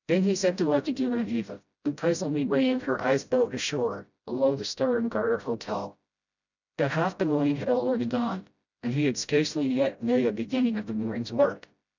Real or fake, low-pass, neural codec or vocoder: fake; 7.2 kHz; codec, 16 kHz, 0.5 kbps, FreqCodec, smaller model